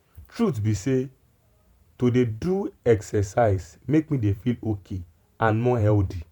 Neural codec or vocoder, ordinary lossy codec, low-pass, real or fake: vocoder, 44.1 kHz, 128 mel bands every 512 samples, BigVGAN v2; MP3, 96 kbps; 19.8 kHz; fake